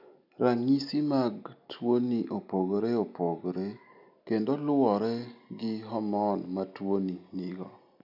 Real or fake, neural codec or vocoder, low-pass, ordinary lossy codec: real; none; 5.4 kHz; none